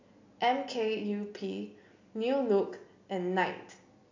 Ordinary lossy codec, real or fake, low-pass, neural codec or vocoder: AAC, 48 kbps; real; 7.2 kHz; none